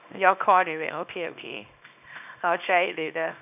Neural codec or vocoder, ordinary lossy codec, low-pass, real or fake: codec, 24 kHz, 0.9 kbps, WavTokenizer, small release; none; 3.6 kHz; fake